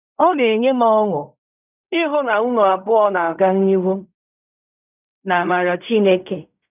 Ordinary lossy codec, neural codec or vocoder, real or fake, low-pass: none; codec, 16 kHz in and 24 kHz out, 0.4 kbps, LongCat-Audio-Codec, fine tuned four codebook decoder; fake; 3.6 kHz